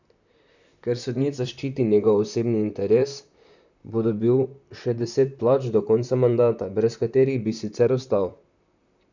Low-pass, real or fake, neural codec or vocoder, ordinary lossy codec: 7.2 kHz; fake; vocoder, 44.1 kHz, 128 mel bands, Pupu-Vocoder; none